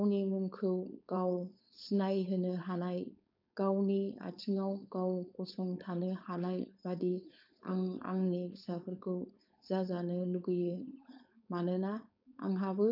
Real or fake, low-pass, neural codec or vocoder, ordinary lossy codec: fake; 5.4 kHz; codec, 16 kHz, 4.8 kbps, FACodec; none